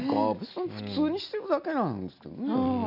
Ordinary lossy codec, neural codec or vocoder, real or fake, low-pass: none; none; real; 5.4 kHz